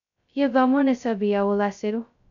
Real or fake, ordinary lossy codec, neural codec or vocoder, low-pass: fake; none; codec, 16 kHz, 0.2 kbps, FocalCodec; 7.2 kHz